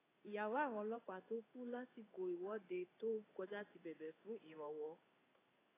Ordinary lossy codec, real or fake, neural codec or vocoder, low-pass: AAC, 16 kbps; fake; codec, 16 kHz in and 24 kHz out, 1 kbps, XY-Tokenizer; 3.6 kHz